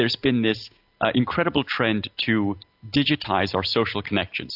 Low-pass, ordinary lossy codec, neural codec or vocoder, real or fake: 5.4 kHz; AAC, 48 kbps; none; real